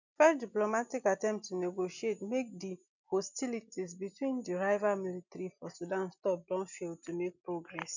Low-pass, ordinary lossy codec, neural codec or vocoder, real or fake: 7.2 kHz; none; none; real